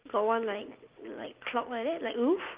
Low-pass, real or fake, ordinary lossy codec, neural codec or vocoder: 3.6 kHz; real; Opus, 24 kbps; none